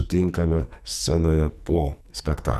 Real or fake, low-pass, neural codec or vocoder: fake; 14.4 kHz; codec, 44.1 kHz, 2.6 kbps, SNAC